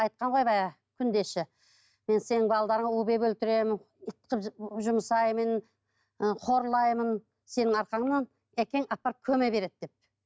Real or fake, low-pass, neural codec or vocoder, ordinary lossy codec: real; none; none; none